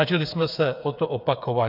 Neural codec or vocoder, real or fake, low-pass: codec, 16 kHz, 8 kbps, FreqCodec, smaller model; fake; 5.4 kHz